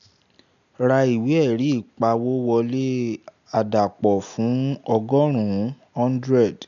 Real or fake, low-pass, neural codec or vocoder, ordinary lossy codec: real; 7.2 kHz; none; none